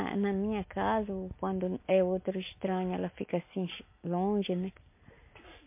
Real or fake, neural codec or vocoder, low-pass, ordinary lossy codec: real; none; 3.6 kHz; MP3, 24 kbps